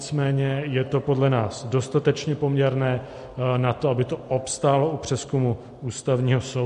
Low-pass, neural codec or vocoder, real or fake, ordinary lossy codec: 14.4 kHz; none; real; MP3, 48 kbps